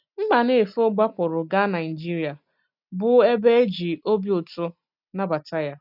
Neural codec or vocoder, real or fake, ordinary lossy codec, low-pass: none; real; none; 5.4 kHz